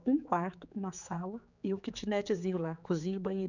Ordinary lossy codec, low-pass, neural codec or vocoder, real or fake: none; 7.2 kHz; codec, 16 kHz, 4 kbps, X-Codec, HuBERT features, trained on general audio; fake